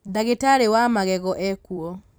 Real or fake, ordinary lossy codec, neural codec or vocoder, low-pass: fake; none; vocoder, 44.1 kHz, 128 mel bands every 256 samples, BigVGAN v2; none